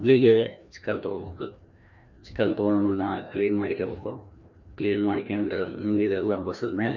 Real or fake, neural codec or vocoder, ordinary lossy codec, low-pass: fake; codec, 16 kHz, 1 kbps, FreqCodec, larger model; none; 7.2 kHz